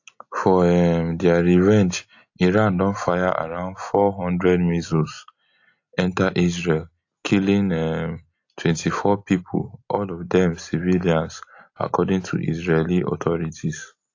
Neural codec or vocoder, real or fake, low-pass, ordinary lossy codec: none; real; 7.2 kHz; AAC, 48 kbps